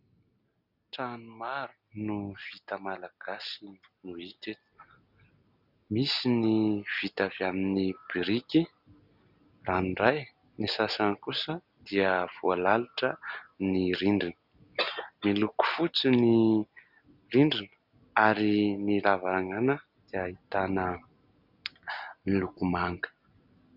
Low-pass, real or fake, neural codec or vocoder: 5.4 kHz; real; none